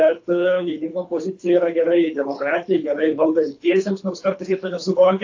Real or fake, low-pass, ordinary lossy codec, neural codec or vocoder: fake; 7.2 kHz; AAC, 48 kbps; codec, 24 kHz, 3 kbps, HILCodec